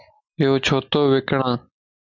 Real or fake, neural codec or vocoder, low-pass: real; none; 7.2 kHz